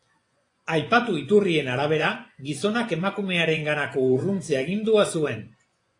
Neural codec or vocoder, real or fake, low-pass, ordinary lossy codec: vocoder, 24 kHz, 100 mel bands, Vocos; fake; 10.8 kHz; AAC, 48 kbps